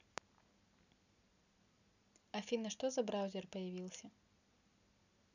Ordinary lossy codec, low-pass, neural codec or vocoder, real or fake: none; 7.2 kHz; none; real